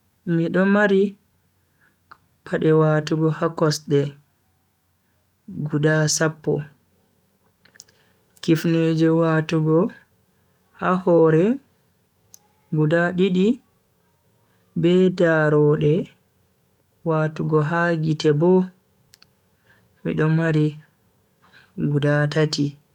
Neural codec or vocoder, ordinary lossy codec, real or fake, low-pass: codec, 44.1 kHz, 7.8 kbps, DAC; none; fake; 19.8 kHz